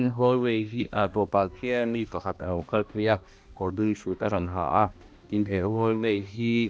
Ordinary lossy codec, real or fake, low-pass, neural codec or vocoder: none; fake; none; codec, 16 kHz, 1 kbps, X-Codec, HuBERT features, trained on balanced general audio